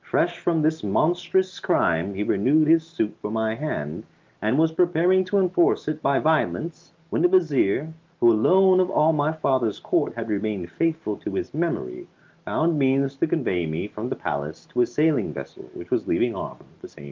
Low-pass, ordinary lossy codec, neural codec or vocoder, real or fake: 7.2 kHz; Opus, 32 kbps; none; real